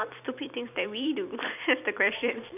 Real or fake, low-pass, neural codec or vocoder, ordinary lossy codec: fake; 3.6 kHz; vocoder, 44.1 kHz, 128 mel bands every 256 samples, BigVGAN v2; none